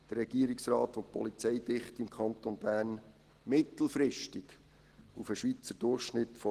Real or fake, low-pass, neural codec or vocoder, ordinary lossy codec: real; 14.4 kHz; none; Opus, 16 kbps